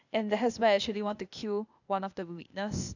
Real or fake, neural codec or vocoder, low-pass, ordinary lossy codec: fake; codec, 16 kHz, 0.8 kbps, ZipCodec; 7.2 kHz; none